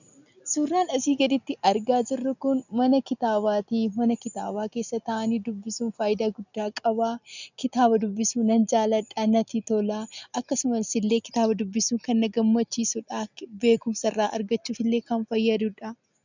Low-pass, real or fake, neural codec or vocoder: 7.2 kHz; real; none